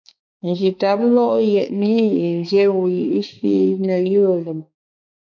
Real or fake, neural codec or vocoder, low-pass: fake; codec, 16 kHz, 4 kbps, X-Codec, HuBERT features, trained on balanced general audio; 7.2 kHz